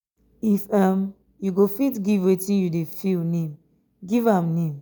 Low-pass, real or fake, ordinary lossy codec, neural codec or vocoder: none; real; none; none